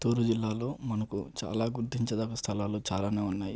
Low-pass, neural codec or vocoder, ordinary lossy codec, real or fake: none; none; none; real